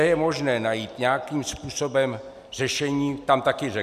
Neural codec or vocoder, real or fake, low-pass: none; real; 14.4 kHz